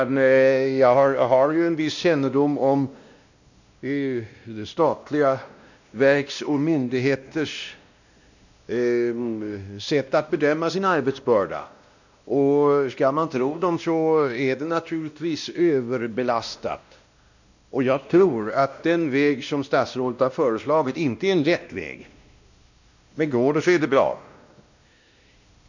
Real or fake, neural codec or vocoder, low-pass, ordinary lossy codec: fake; codec, 16 kHz, 1 kbps, X-Codec, WavLM features, trained on Multilingual LibriSpeech; 7.2 kHz; none